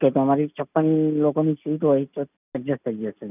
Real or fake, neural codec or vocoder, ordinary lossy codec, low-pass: real; none; none; 3.6 kHz